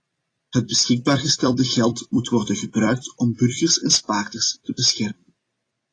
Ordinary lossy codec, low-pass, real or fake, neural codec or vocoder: AAC, 32 kbps; 9.9 kHz; fake; vocoder, 44.1 kHz, 128 mel bands every 256 samples, BigVGAN v2